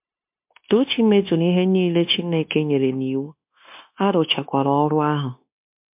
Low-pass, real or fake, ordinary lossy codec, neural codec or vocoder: 3.6 kHz; fake; MP3, 32 kbps; codec, 16 kHz, 0.9 kbps, LongCat-Audio-Codec